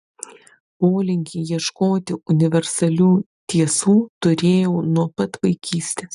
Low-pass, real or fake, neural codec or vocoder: 10.8 kHz; real; none